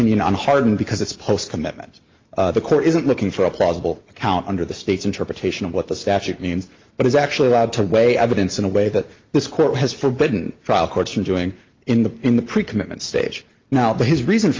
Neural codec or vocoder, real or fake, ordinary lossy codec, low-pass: none; real; Opus, 32 kbps; 7.2 kHz